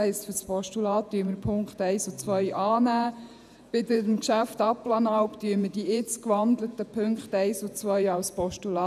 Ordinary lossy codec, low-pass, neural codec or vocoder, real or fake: none; 14.4 kHz; vocoder, 48 kHz, 128 mel bands, Vocos; fake